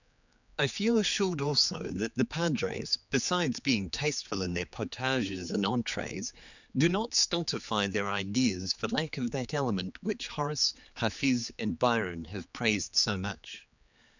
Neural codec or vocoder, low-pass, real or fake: codec, 16 kHz, 4 kbps, X-Codec, HuBERT features, trained on general audio; 7.2 kHz; fake